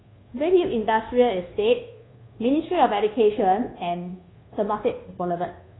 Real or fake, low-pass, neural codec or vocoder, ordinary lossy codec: fake; 7.2 kHz; codec, 24 kHz, 1.2 kbps, DualCodec; AAC, 16 kbps